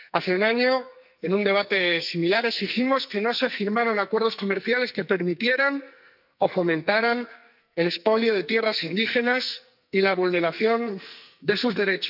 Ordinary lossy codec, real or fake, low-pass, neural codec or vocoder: none; fake; 5.4 kHz; codec, 44.1 kHz, 2.6 kbps, SNAC